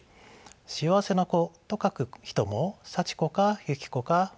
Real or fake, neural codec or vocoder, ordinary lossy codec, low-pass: real; none; none; none